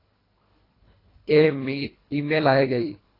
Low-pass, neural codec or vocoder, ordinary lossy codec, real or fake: 5.4 kHz; codec, 24 kHz, 1.5 kbps, HILCodec; AAC, 24 kbps; fake